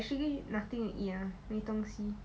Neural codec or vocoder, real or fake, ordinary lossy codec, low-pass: none; real; none; none